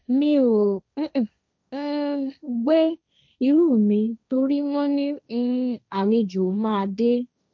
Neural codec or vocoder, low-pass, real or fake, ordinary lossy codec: codec, 16 kHz, 1.1 kbps, Voila-Tokenizer; none; fake; none